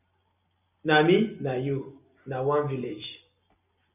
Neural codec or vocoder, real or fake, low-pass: none; real; 3.6 kHz